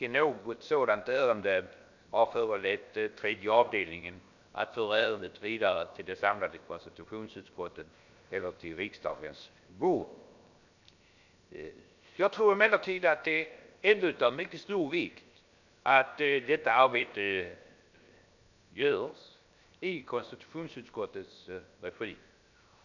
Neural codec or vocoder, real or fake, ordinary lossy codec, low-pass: codec, 16 kHz, 0.7 kbps, FocalCodec; fake; none; 7.2 kHz